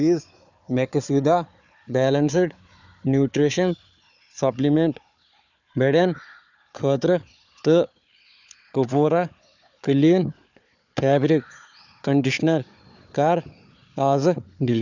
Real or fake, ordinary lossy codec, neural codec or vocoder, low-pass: fake; none; codec, 16 kHz, 4 kbps, FunCodec, trained on Chinese and English, 50 frames a second; 7.2 kHz